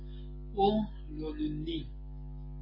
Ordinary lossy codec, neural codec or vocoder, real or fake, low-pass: MP3, 24 kbps; none; real; 5.4 kHz